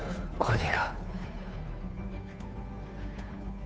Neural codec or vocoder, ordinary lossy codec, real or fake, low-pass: codec, 16 kHz, 2 kbps, FunCodec, trained on Chinese and English, 25 frames a second; none; fake; none